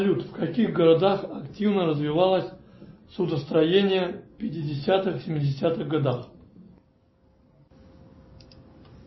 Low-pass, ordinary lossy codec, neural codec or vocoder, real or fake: 7.2 kHz; MP3, 24 kbps; none; real